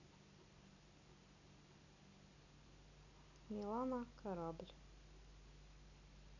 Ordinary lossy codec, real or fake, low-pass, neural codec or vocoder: none; real; 7.2 kHz; none